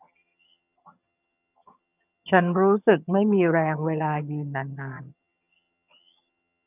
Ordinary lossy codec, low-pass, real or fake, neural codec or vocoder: none; 3.6 kHz; fake; vocoder, 22.05 kHz, 80 mel bands, HiFi-GAN